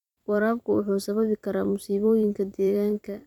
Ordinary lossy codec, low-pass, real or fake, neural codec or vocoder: none; 19.8 kHz; fake; vocoder, 44.1 kHz, 128 mel bands every 256 samples, BigVGAN v2